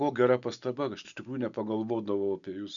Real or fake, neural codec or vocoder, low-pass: real; none; 7.2 kHz